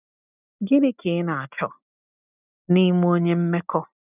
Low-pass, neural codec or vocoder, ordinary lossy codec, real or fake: 3.6 kHz; none; none; real